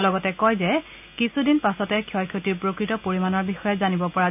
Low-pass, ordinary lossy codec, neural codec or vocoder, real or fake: 3.6 kHz; none; none; real